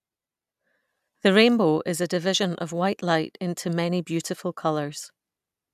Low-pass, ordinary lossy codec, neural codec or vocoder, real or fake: 14.4 kHz; none; none; real